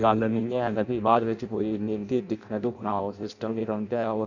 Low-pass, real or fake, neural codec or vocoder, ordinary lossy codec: 7.2 kHz; fake; codec, 16 kHz in and 24 kHz out, 0.6 kbps, FireRedTTS-2 codec; none